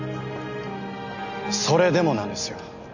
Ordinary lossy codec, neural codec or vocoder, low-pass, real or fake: none; none; 7.2 kHz; real